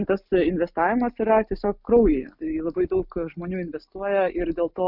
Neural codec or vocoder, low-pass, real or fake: none; 5.4 kHz; real